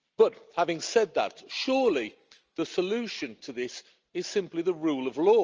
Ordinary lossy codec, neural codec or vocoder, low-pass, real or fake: Opus, 24 kbps; none; 7.2 kHz; real